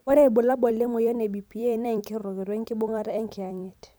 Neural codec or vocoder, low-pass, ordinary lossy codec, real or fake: vocoder, 44.1 kHz, 128 mel bands every 256 samples, BigVGAN v2; none; none; fake